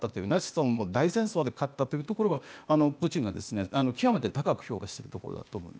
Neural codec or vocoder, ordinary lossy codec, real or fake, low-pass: codec, 16 kHz, 0.8 kbps, ZipCodec; none; fake; none